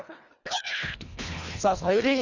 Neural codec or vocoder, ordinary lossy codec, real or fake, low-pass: codec, 24 kHz, 1.5 kbps, HILCodec; Opus, 64 kbps; fake; 7.2 kHz